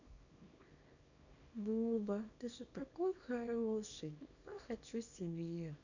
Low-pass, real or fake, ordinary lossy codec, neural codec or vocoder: 7.2 kHz; fake; none; codec, 24 kHz, 0.9 kbps, WavTokenizer, small release